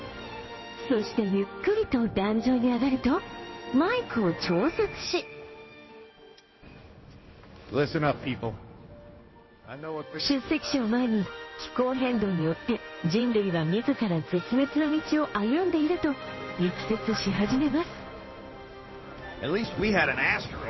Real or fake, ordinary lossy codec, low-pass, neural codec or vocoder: fake; MP3, 24 kbps; 7.2 kHz; codec, 16 kHz in and 24 kHz out, 1 kbps, XY-Tokenizer